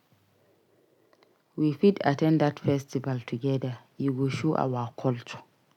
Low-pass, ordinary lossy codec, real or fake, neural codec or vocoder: 19.8 kHz; none; real; none